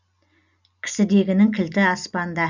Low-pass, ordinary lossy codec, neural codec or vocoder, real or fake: 7.2 kHz; none; none; real